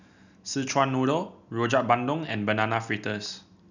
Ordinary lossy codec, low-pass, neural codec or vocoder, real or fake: none; 7.2 kHz; none; real